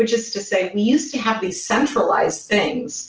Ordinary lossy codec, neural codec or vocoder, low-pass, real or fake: Opus, 16 kbps; none; 7.2 kHz; real